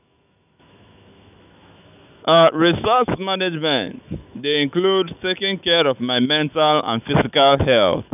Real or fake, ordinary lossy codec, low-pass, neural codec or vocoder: fake; none; 3.6 kHz; autoencoder, 48 kHz, 128 numbers a frame, DAC-VAE, trained on Japanese speech